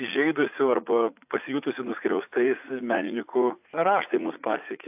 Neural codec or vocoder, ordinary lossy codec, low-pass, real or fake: vocoder, 44.1 kHz, 80 mel bands, Vocos; AAC, 32 kbps; 3.6 kHz; fake